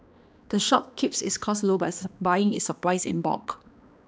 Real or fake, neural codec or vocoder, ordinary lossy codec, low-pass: fake; codec, 16 kHz, 2 kbps, X-Codec, HuBERT features, trained on balanced general audio; none; none